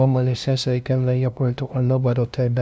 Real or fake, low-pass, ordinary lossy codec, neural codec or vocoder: fake; none; none; codec, 16 kHz, 0.5 kbps, FunCodec, trained on LibriTTS, 25 frames a second